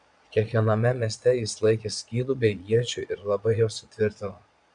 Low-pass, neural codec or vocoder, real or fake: 9.9 kHz; vocoder, 22.05 kHz, 80 mel bands, Vocos; fake